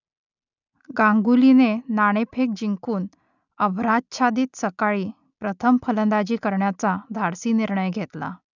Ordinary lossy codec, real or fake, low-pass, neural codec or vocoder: none; real; 7.2 kHz; none